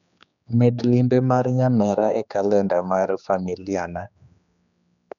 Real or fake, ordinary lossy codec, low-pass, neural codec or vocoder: fake; none; 7.2 kHz; codec, 16 kHz, 2 kbps, X-Codec, HuBERT features, trained on general audio